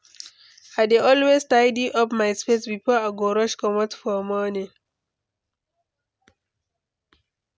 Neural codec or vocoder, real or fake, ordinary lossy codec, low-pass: none; real; none; none